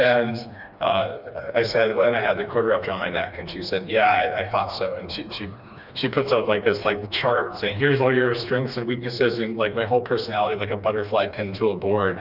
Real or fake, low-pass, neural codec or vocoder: fake; 5.4 kHz; codec, 16 kHz, 2 kbps, FreqCodec, smaller model